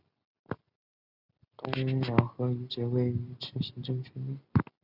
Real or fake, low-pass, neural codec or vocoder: real; 5.4 kHz; none